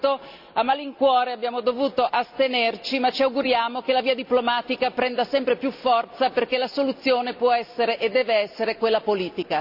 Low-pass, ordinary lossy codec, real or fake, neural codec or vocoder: 5.4 kHz; none; real; none